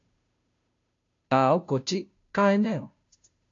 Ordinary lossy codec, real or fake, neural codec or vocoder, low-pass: AAC, 48 kbps; fake; codec, 16 kHz, 0.5 kbps, FunCodec, trained on Chinese and English, 25 frames a second; 7.2 kHz